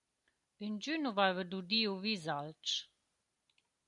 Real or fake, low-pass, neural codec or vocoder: real; 10.8 kHz; none